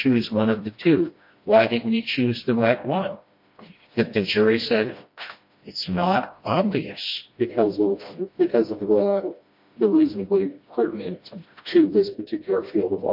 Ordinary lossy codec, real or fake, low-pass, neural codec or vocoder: MP3, 32 kbps; fake; 5.4 kHz; codec, 16 kHz, 1 kbps, FreqCodec, smaller model